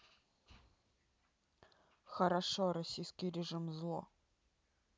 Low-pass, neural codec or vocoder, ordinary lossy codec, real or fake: none; none; none; real